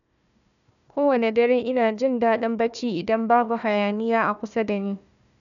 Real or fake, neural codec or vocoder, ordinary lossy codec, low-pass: fake; codec, 16 kHz, 1 kbps, FunCodec, trained on Chinese and English, 50 frames a second; none; 7.2 kHz